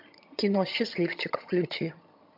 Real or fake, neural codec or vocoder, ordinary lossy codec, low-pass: fake; vocoder, 22.05 kHz, 80 mel bands, HiFi-GAN; MP3, 48 kbps; 5.4 kHz